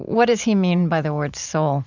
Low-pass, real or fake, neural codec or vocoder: 7.2 kHz; fake; vocoder, 44.1 kHz, 128 mel bands every 512 samples, BigVGAN v2